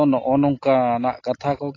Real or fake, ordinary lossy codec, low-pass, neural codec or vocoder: real; AAC, 32 kbps; 7.2 kHz; none